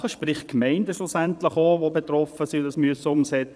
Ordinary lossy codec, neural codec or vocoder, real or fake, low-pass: none; vocoder, 22.05 kHz, 80 mel bands, Vocos; fake; none